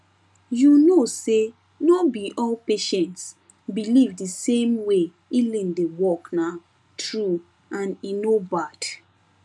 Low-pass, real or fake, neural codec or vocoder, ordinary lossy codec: none; real; none; none